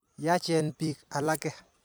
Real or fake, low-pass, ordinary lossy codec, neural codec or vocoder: fake; none; none; vocoder, 44.1 kHz, 128 mel bands every 256 samples, BigVGAN v2